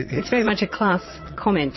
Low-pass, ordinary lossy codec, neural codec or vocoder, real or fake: 7.2 kHz; MP3, 24 kbps; vocoder, 22.05 kHz, 80 mel bands, Vocos; fake